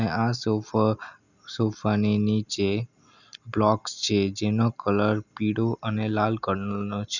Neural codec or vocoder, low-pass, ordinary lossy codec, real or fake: none; 7.2 kHz; none; real